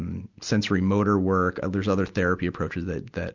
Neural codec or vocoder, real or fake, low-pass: none; real; 7.2 kHz